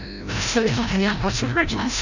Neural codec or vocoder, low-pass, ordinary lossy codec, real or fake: codec, 16 kHz, 0.5 kbps, FreqCodec, larger model; 7.2 kHz; none; fake